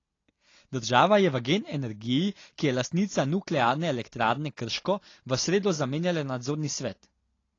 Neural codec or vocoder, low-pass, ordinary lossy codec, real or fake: none; 7.2 kHz; AAC, 32 kbps; real